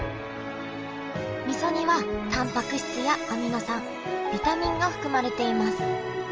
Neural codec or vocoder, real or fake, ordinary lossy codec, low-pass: none; real; Opus, 24 kbps; 7.2 kHz